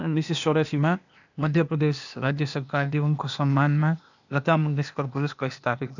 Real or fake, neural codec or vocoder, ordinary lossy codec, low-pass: fake; codec, 16 kHz, 0.8 kbps, ZipCodec; none; 7.2 kHz